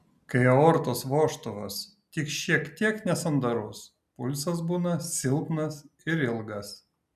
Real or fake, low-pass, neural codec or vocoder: real; 14.4 kHz; none